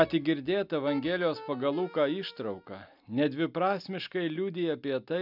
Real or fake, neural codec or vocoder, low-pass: real; none; 5.4 kHz